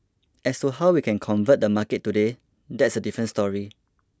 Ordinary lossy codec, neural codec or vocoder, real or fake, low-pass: none; none; real; none